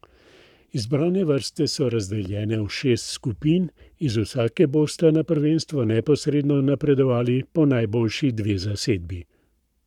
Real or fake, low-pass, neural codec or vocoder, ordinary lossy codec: fake; 19.8 kHz; codec, 44.1 kHz, 7.8 kbps, Pupu-Codec; none